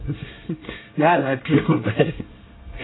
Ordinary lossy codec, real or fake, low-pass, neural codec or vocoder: AAC, 16 kbps; fake; 7.2 kHz; codec, 24 kHz, 1 kbps, SNAC